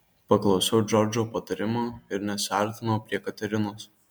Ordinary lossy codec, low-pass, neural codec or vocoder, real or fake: MP3, 96 kbps; 19.8 kHz; none; real